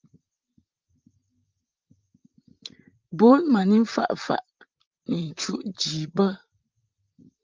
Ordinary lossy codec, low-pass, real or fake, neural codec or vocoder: Opus, 32 kbps; 7.2 kHz; fake; vocoder, 44.1 kHz, 128 mel bands, Pupu-Vocoder